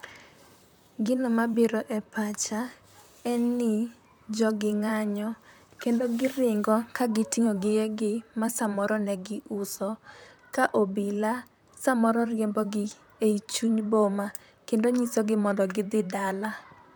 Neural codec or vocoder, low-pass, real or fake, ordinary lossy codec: vocoder, 44.1 kHz, 128 mel bands, Pupu-Vocoder; none; fake; none